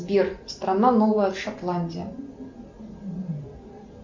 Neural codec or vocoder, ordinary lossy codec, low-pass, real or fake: none; MP3, 64 kbps; 7.2 kHz; real